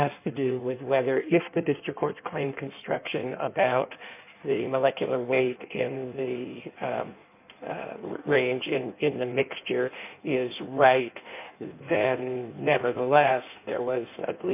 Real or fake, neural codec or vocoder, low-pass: fake; codec, 16 kHz in and 24 kHz out, 1.1 kbps, FireRedTTS-2 codec; 3.6 kHz